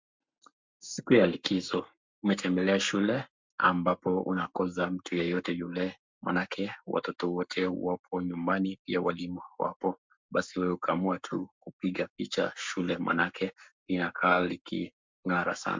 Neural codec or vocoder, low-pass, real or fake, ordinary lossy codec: codec, 44.1 kHz, 7.8 kbps, Pupu-Codec; 7.2 kHz; fake; MP3, 64 kbps